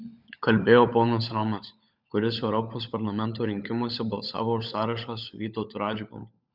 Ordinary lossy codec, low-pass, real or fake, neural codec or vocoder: Opus, 64 kbps; 5.4 kHz; fake; codec, 16 kHz, 16 kbps, FunCodec, trained on LibriTTS, 50 frames a second